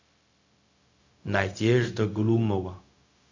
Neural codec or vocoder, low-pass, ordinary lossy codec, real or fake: codec, 16 kHz, 0.4 kbps, LongCat-Audio-Codec; 7.2 kHz; MP3, 48 kbps; fake